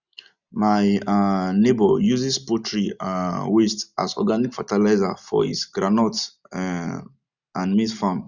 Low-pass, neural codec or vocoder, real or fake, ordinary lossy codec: 7.2 kHz; none; real; none